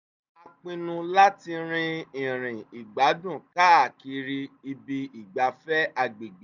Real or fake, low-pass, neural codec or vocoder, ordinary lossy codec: real; 7.2 kHz; none; none